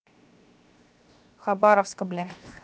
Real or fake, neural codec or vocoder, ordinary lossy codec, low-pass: fake; codec, 16 kHz, 0.7 kbps, FocalCodec; none; none